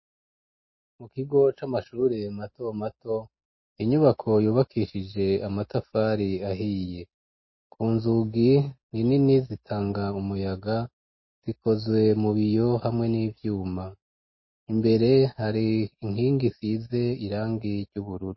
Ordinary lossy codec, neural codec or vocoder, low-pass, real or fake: MP3, 24 kbps; none; 7.2 kHz; real